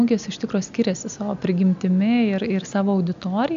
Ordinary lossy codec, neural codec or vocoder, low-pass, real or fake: AAC, 96 kbps; none; 7.2 kHz; real